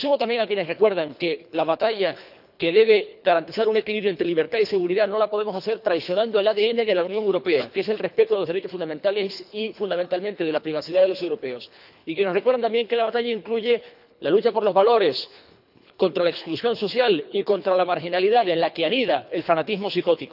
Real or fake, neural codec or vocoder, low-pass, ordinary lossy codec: fake; codec, 24 kHz, 3 kbps, HILCodec; 5.4 kHz; none